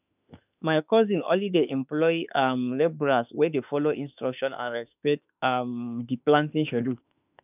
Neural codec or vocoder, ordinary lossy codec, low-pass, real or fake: autoencoder, 48 kHz, 32 numbers a frame, DAC-VAE, trained on Japanese speech; none; 3.6 kHz; fake